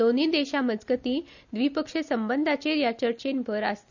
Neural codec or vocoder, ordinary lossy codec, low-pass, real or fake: none; none; 7.2 kHz; real